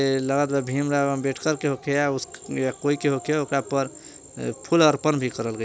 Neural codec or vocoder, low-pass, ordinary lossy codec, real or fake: none; none; none; real